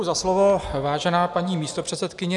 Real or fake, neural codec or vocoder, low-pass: real; none; 10.8 kHz